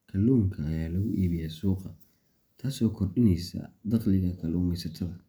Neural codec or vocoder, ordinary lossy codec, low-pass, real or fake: none; none; none; real